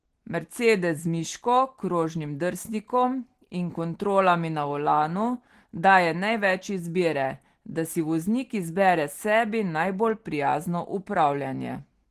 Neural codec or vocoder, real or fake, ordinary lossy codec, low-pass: none; real; Opus, 16 kbps; 14.4 kHz